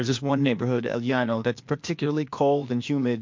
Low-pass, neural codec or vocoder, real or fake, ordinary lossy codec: 7.2 kHz; codec, 16 kHz, 0.8 kbps, ZipCodec; fake; MP3, 48 kbps